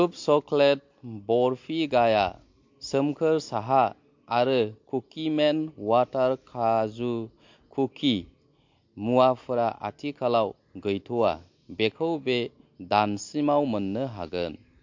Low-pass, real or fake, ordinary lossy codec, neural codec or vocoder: 7.2 kHz; real; MP3, 48 kbps; none